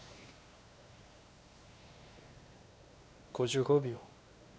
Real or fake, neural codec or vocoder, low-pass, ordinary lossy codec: fake; codec, 16 kHz, 2 kbps, X-Codec, WavLM features, trained on Multilingual LibriSpeech; none; none